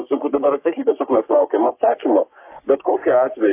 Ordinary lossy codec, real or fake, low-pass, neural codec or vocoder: AAC, 32 kbps; fake; 3.6 kHz; codec, 44.1 kHz, 3.4 kbps, Pupu-Codec